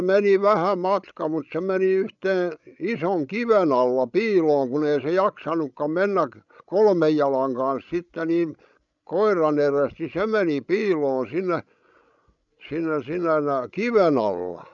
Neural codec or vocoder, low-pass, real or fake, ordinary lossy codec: codec, 16 kHz, 16 kbps, FreqCodec, larger model; 7.2 kHz; fake; none